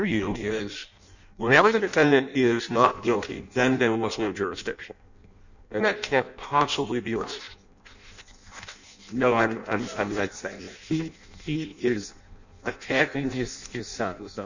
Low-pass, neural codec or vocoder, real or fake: 7.2 kHz; codec, 16 kHz in and 24 kHz out, 0.6 kbps, FireRedTTS-2 codec; fake